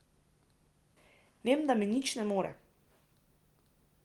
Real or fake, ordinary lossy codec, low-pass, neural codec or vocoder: real; Opus, 24 kbps; 19.8 kHz; none